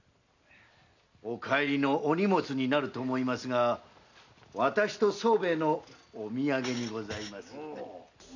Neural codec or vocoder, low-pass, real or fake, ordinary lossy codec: none; 7.2 kHz; real; none